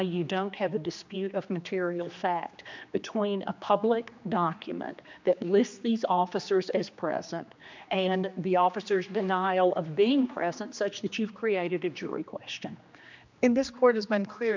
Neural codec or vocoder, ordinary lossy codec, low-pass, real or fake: codec, 16 kHz, 2 kbps, X-Codec, HuBERT features, trained on general audio; MP3, 64 kbps; 7.2 kHz; fake